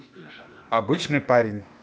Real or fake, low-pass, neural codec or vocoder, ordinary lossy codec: fake; none; codec, 16 kHz, 0.8 kbps, ZipCodec; none